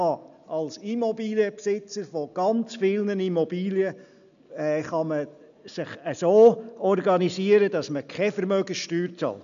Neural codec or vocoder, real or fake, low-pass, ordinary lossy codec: none; real; 7.2 kHz; none